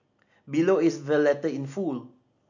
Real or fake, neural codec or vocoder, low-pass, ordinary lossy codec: real; none; 7.2 kHz; none